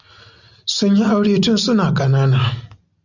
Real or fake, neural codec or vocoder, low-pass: real; none; 7.2 kHz